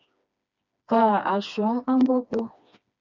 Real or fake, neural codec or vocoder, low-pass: fake; codec, 16 kHz, 2 kbps, FreqCodec, smaller model; 7.2 kHz